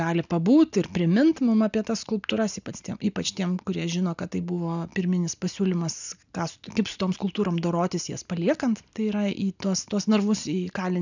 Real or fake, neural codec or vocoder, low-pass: real; none; 7.2 kHz